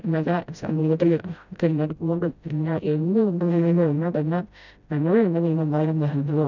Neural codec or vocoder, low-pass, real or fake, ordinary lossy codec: codec, 16 kHz, 0.5 kbps, FreqCodec, smaller model; 7.2 kHz; fake; none